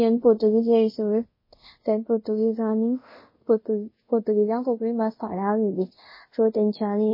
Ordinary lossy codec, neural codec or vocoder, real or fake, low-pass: MP3, 24 kbps; codec, 24 kHz, 0.5 kbps, DualCodec; fake; 5.4 kHz